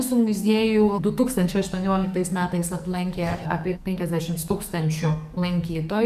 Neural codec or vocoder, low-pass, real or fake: codec, 44.1 kHz, 2.6 kbps, SNAC; 14.4 kHz; fake